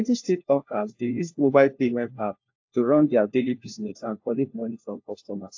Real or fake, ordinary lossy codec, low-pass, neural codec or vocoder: fake; AAC, 48 kbps; 7.2 kHz; codec, 16 kHz, 1 kbps, FunCodec, trained on LibriTTS, 50 frames a second